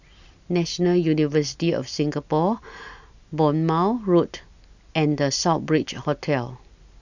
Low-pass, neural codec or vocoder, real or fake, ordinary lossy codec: 7.2 kHz; none; real; none